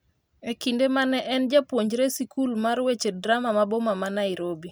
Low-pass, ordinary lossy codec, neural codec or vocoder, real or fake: none; none; none; real